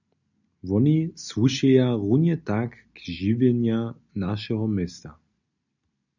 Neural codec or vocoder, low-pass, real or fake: none; 7.2 kHz; real